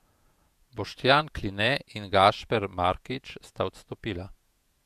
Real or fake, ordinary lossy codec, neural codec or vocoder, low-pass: fake; MP3, 96 kbps; vocoder, 48 kHz, 128 mel bands, Vocos; 14.4 kHz